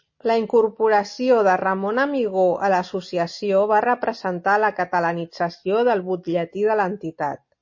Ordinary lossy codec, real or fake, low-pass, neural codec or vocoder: MP3, 32 kbps; real; 7.2 kHz; none